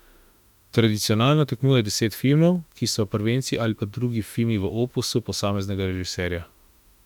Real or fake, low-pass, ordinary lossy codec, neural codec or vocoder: fake; 19.8 kHz; none; autoencoder, 48 kHz, 32 numbers a frame, DAC-VAE, trained on Japanese speech